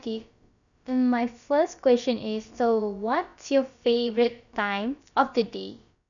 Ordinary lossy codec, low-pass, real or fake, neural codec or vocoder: none; 7.2 kHz; fake; codec, 16 kHz, about 1 kbps, DyCAST, with the encoder's durations